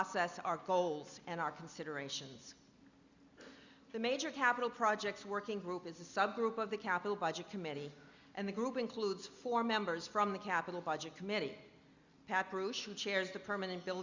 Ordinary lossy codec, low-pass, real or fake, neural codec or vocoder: Opus, 64 kbps; 7.2 kHz; real; none